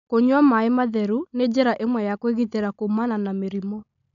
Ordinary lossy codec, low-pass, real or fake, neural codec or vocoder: none; 7.2 kHz; real; none